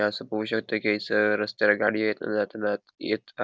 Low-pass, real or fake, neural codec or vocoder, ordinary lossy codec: none; real; none; none